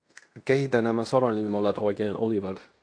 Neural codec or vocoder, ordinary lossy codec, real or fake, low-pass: codec, 16 kHz in and 24 kHz out, 0.9 kbps, LongCat-Audio-Codec, fine tuned four codebook decoder; AAC, 48 kbps; fake; 9.9 kHz